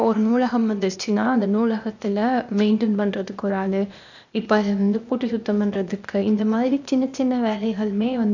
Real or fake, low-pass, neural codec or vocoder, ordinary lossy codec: fake; 7.2 kHz; codec, 16 kHz, 0.8 kbps, ZipCodec; none